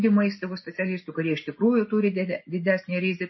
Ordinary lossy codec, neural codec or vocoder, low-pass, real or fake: MP3, 24 kbps; none; 7.2 kHz; real